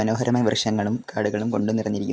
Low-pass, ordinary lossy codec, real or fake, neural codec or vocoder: none; none; real; none